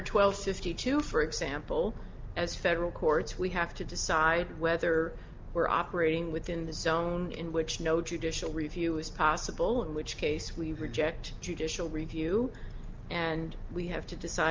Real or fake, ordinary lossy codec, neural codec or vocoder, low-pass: real; Opus, 32 kbps; none; 7.2 kHz